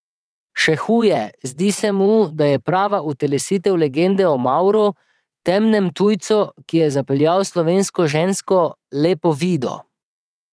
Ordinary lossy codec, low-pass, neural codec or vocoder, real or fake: none; none; vocoder, 22.05 kHz, 80 mel bands, WaveNeXt; fake